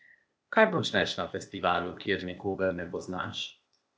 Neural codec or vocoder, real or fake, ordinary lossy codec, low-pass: codec, 16 kHz, 0.8 kbps, ZipCodec; fake; none; none